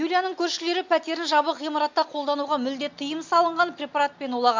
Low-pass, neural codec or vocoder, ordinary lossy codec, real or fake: 7.2 kHz; none; MP3, 64 kbps; real